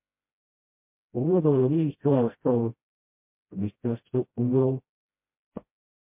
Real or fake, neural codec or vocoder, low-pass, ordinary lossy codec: fake; codec, 16 kHz, 0.5 kbps, FreqCodec, smaller model; 3.6 kHz; MP3, 32 kbps